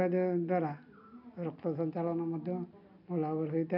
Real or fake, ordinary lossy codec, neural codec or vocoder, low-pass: real; none; none; 5.4 kHz